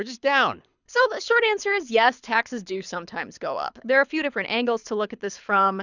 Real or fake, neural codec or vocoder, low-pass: fake; codec, 24 kHz, 6 kbps, HILCodec; 7.2 kHz